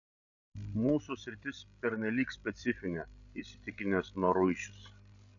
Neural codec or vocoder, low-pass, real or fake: codec, 16 kHz, 16 kbps, FreqCodec, larger model; 7.2 kHz; fake